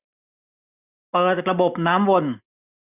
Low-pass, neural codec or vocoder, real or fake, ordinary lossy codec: 3.6 kHz; none; real; none